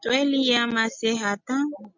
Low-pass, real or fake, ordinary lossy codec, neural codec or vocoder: 7.2 kHz; real; MP3, 64 kbps; none